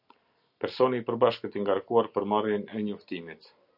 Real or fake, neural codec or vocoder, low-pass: real; none; 5.4 kHz